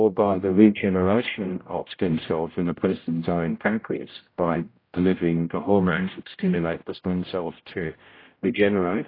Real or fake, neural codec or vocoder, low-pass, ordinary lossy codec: fake; codec, 16 kHz, 0.5 kbps, X-Codec, HuBERT features, trained on general audio; 5.4 kHz; AAC, 24 kbps